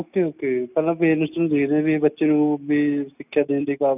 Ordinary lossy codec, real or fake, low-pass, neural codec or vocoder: none; real; 3.6 kHz; none